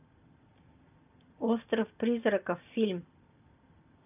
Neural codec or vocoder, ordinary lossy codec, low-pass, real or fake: none; none; 3.6 kHz; real